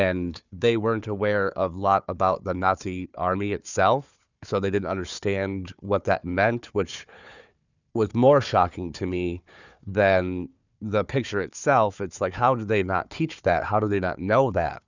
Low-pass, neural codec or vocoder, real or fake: 7.2 kHz; codec, 16 kHz, 4 kbps, FunCodec, trained on Chinese and English, 50 frames a second; fake